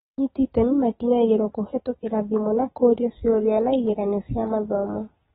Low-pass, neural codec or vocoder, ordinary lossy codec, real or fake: 7.2 kHz; none; AAC, 16 kbps; real